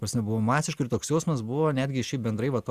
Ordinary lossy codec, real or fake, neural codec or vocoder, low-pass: Opus, 64 kbps; real; none; 14.4 kHz